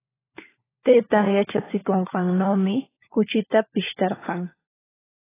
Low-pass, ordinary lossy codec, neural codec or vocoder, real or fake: 3.6 kHz; AAC, 16 kbps; codec, 16 kHz, 4 kbps, FunCodec, trained on LibriTTS, 50 frames a second; fake